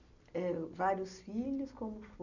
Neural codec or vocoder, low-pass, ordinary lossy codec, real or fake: none; 7.2 kHz; none; real